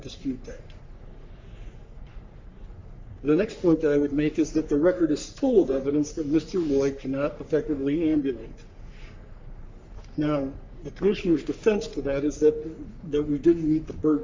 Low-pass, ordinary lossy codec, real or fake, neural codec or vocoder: 7.2 kHz; MP3, 64 kbps; fake; codec, 44.1 kHz, 3.4 kbps, Pupu-Codec